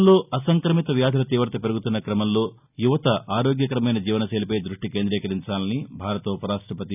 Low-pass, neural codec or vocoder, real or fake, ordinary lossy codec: 3.6 kHz; none; real; none